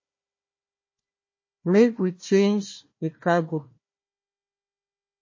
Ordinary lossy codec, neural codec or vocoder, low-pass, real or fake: MP3, 32 kbps; codec, 16 kHz, 1 kbps, FunCodec, trained on Chinese and English, 50 frames a second; 7.2 kHz; fake